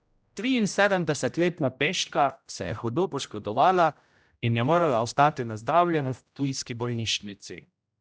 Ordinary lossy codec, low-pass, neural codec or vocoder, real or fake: none; none; codec, 16 kHz, 0.5 kbps, X-Codec, HuBERT features, trained on general audio; fake